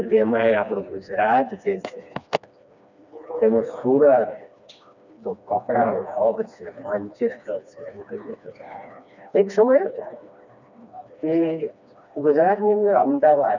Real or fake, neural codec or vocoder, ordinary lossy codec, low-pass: fake; codec, 16 kHz, 2 kbps, FreqCodec, smaller model; none; 7.2 kHz